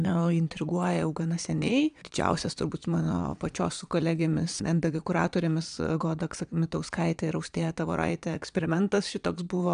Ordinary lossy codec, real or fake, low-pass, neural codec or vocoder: AAC, 96 kbps; fake; 9.9 kHz; vocoder, 22.05 kHz, 80 mel bands, Vocos